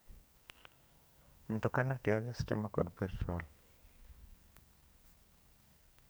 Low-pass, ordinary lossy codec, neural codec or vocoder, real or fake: none; none; codec, 44.1 kHz, 2.6 kbps, SNAC; fake